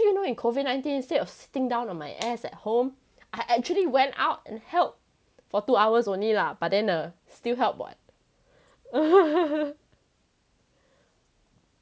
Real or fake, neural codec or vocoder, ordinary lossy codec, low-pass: real; none; none; none